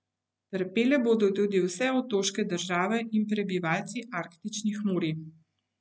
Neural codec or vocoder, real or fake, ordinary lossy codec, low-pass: none; real; none; none